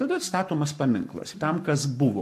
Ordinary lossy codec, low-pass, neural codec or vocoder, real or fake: MP3, 64 kbps; 14.4 kHz; codec, 44.1 kHz, 7.8 kbps, Pupu-Codec; fake